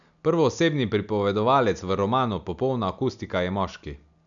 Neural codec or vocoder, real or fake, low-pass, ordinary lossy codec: none; real; 7.2 kHz; none